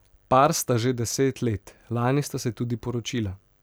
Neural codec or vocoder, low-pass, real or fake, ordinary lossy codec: none; none; real; none